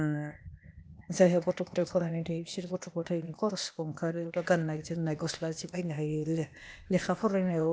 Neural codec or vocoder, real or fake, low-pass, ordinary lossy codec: codec, 16 kHz, 0.8 kbps, ZipCodec; fake; none; none